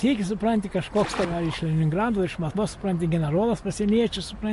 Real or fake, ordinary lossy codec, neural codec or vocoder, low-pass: real; MP3, 48 kbps; none; 14.4 kHz